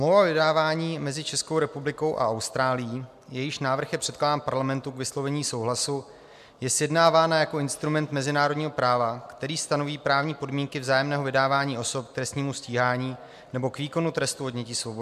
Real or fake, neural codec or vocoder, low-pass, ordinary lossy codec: real; none; 14.4 kHz; MP3, 96 kbps